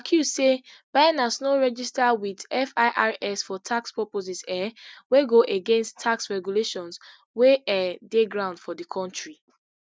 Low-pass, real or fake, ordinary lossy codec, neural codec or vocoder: none; real; none; none